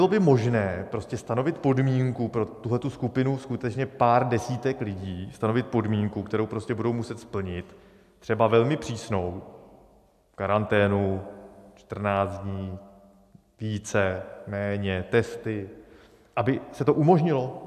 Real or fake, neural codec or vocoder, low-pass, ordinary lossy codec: real; none; 14.4 kHz; AAC, 96 kbps